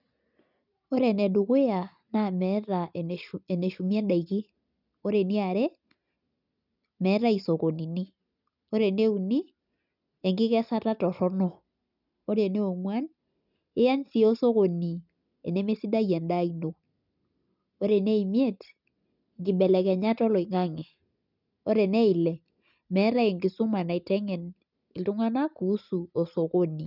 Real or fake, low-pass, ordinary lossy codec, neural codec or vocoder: real; 5.4 kHz; none; none